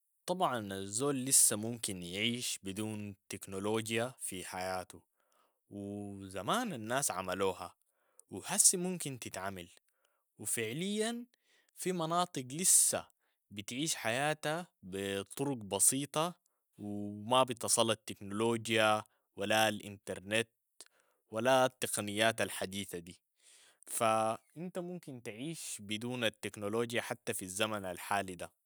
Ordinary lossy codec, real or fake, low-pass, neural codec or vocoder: none; real; none; none